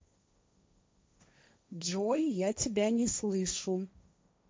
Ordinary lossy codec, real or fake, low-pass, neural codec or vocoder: none; fake; none; codec, 16 kHz, 1.1 kbps, Voila-Tokenizer